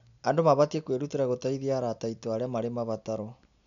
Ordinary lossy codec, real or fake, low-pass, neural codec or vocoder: none; real; 7.2 kHz; none